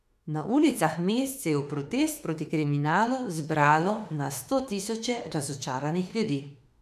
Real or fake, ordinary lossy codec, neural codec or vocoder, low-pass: fake; none; autoencoder, 48 kHz, 32 numbers a frame, DAC-VAE, trained on Japanese speech; 14.4 kHz